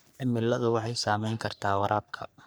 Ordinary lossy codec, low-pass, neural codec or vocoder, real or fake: none; none; codec, 44.1 kHz, 3.4 kbps, Pupu-Codec; fake